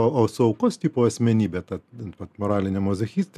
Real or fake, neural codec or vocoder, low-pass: real; none; 14.4 kHz